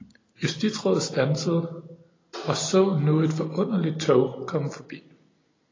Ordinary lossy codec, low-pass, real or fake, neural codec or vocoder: AAC, 32 kbps; 7.2 kHz; real; none